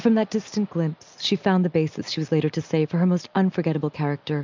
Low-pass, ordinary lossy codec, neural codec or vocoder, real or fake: 7.2 kHz; MP3, 64 kbps; none; real